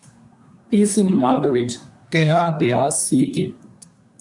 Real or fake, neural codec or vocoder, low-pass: fake; codec, 24 kHz, 1 kbps, SNAC; 10.8 kHz